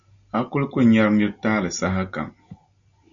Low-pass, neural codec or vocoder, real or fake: 7.2 kHz; none; real